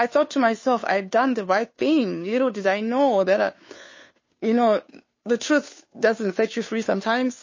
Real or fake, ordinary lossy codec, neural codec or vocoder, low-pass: fake; MP3, 32 kbps; codec, 16 kHz, 2 kbps, FunCodec, trained on Chinese and English, 25 frames a second; 7.2 kHz